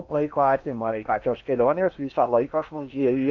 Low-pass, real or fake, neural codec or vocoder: 7.2 kHz; fake; codec, 16 kHz in and 24 kHz out, 0.8 kbps, FocalCodec, streaming, 65536 codes